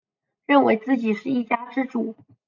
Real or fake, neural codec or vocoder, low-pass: real; none; 7.2 kHz